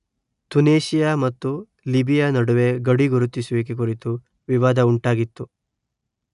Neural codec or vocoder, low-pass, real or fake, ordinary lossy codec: none; 10.8 kHz; real; none